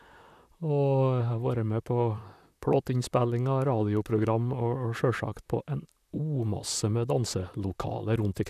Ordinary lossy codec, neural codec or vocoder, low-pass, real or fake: none; vocoder, 44.1 kHz, 128 mel bands, Pupu-Vocoder; 14.4 kHz; fake